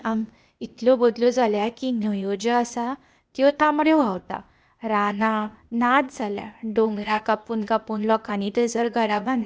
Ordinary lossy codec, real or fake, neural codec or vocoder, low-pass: none; fake; codec, 16 kHz, 0.8 kbps, ZipCodec; none